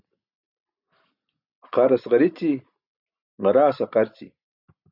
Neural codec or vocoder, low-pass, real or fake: none; 5.4 kHz; real